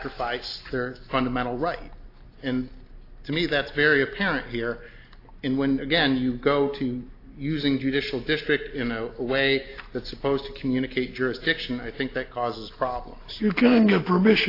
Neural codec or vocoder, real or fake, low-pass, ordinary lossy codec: none; real; 5.4 kHz; AAC, 32 kbps